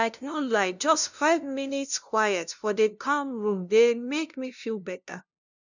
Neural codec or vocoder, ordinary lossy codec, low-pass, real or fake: codec, 16 kHz, 0.5 kbps, FunCodec, trained on LibriTTS, 25 frames a second; none; 7.2 kHz; fake